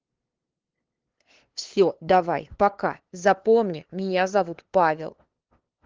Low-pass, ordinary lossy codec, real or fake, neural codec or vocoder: 7.2 kHz; Opus, 16 kbps; fake; codec, 16 kHz, 2 kbps, FunCodec, trained on LibriTTS, 25 frames a second